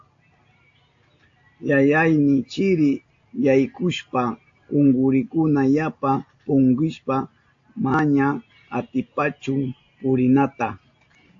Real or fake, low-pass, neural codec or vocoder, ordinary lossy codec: real; 7.2 kHz; none; AAC, 48 kbps